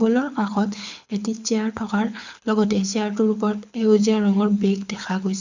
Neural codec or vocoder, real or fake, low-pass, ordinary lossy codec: codec, 24 kHz, 6 kbps, HILCodec; fake; 7.2 kHz; none